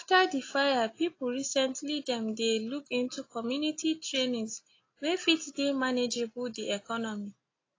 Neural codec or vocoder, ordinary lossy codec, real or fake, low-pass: none; AAC, 32 kbps; real; 7.2 kHz